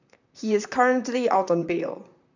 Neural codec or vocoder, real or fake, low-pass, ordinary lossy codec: vocoder, 44.1 kHz, 128 mel bands, Pupu-Vocoder; fake; 7.2 kHz; none